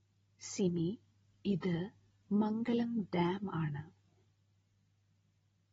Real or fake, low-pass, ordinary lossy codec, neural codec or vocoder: real; 19.8 kHz; AAC, 24 kbps; none